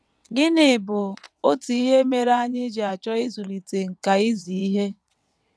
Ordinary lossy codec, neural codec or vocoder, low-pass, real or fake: none; vocoder, 22.05 kHz, 80 mel bands, WaveNeXt; none; fake